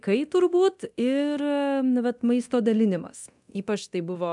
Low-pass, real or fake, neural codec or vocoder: 10.8 kHz; fake; codec, 24 kHz, 0.9 kbps, DualCodec